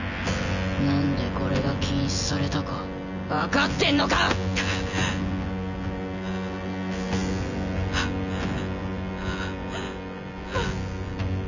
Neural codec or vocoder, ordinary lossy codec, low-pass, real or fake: vocoder, 24 kHz, 100 mel bands, Vocos; none; 7.2 kHz; fake